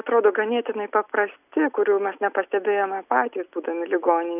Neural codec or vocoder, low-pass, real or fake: none; 3.6 kHz; real